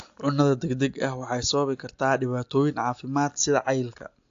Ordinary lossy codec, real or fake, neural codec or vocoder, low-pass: AAC, 48 kbps; real; none; 7.2 kHz